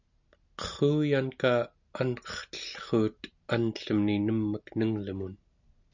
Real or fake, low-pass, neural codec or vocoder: real; 7.2 kHz; none